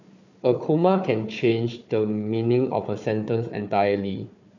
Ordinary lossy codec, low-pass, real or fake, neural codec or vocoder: none; 7.2 kHz; fake; codec, 16 kHz, 4 kbps, FunCodec, trained on Chinese and English, 50 frames a second